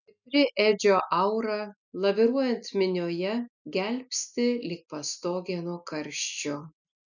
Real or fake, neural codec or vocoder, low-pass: real; none; 7.2 kHz